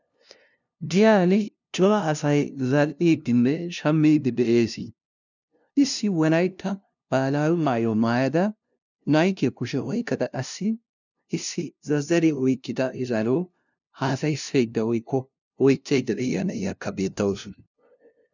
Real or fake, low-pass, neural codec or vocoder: fake; 7.2 kHz; codec, 16 kHz, 0.5 kbps, FunCodec, trained on LibriTTS, 25 frames a second